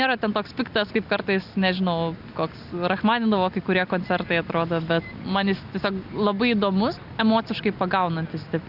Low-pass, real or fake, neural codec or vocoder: 5.4 kHz; real; none